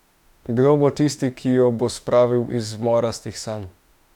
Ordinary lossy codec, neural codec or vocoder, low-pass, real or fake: none; autoencoder, 48 kHz, 32 numbers a frame, DAC-VAE, trained on Japanese speech; 19.8 kHz; fake